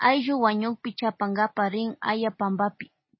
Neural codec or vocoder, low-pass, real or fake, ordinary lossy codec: none; 7.2 kHz; real; MP3, 24 kbps